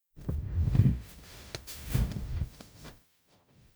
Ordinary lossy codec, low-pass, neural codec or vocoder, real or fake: none; none; codec, 44.1 kHz, 0.9 kbps, DAC; fake